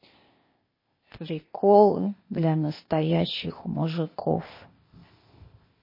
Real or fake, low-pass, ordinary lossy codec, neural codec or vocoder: fake; 5.4 kHz; MP3, 24 kbps; codec, 16 kHz, 0.8 kbps, ZipCodec